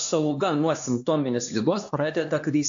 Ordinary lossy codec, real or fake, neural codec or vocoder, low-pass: AAC, 64 kbps; fake; codec, 16 kHz, 2 kbps, X-Codec, HuBERT features, trained on LibriSpeech; 7.2 kHz